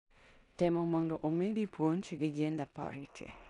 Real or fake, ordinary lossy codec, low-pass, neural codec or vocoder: fake; none; 10.8 kHz; codec, 16 kHz in and 24 kHz out, 0.9 kbps, LongCat-Audio-Codec, four codebook decoder